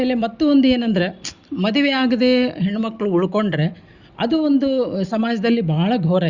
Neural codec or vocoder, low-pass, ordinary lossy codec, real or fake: none; 7.2 kHz; Opus, 64 kbps; real